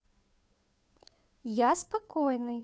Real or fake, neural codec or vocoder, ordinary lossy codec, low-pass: fake; codec, 16 kHz, 2 kbps, FunCodec, trained on Chinese and English, 25 frames a second; none; none